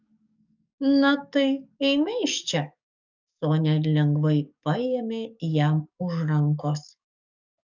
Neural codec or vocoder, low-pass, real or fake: codec, 44.1 kHz, 7.8 kbps, DAC; 7.2 kHz; fake